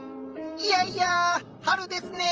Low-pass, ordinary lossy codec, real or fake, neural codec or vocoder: 7.2 kHz; Opus, 24 kbps; fake; vocoder, 44.1 kHz, 128 mel bands, Pupu-Vocoder